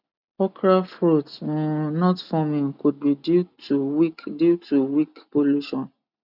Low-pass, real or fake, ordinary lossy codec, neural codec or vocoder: 5.4 kHz; real; none; none